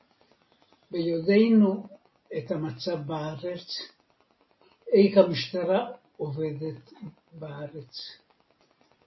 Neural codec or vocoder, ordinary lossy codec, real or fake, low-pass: none; MP3, 24 kbps; real; 7.2 kHz